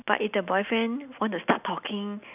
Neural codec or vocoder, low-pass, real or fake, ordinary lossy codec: none; 3.6 kHz; real; none